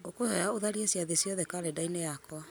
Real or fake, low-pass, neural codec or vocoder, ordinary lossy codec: real; none; none; none